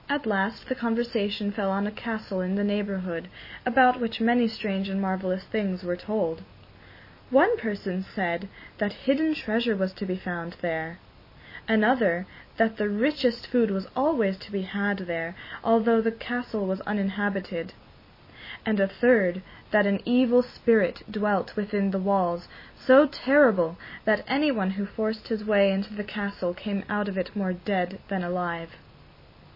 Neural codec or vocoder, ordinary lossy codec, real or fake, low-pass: none; MP3, 24 kbps; real; 5.4 kHz